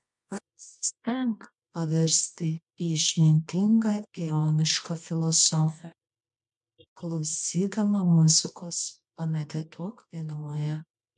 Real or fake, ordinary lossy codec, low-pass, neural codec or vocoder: fake; MP3, 64 kbps; 10.8 kHz; codec, 24 kHz, 0.9 kbps, WavTokenizer, medium music audio release